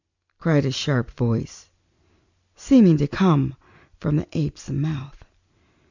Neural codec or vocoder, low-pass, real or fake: none; 7.2 kHz; real